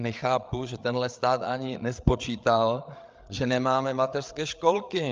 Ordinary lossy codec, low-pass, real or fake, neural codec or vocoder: Opus, 24 kbps; 7.2 kHz; fake; codec, 16 kHz, 8 kbps, FreqCodec, larger model